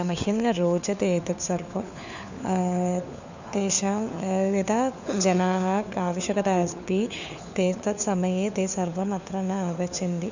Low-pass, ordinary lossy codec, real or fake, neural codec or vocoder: 7.2 kHz; none; fake; codec, 16 kHz, 4 kbps, FunCodec, trained on LibriTTS, 50 frames a second